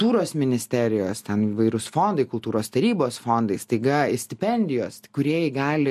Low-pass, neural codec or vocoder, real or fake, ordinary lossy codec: 14.4 kHz; none; real; MP3, 64 kbps